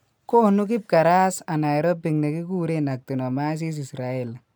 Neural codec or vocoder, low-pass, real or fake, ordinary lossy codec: none; none; real; none